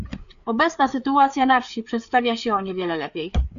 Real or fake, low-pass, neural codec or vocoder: fake; 7.2 kHz; codec, 16 kHz, 4 kbps, FreqCodec, larger model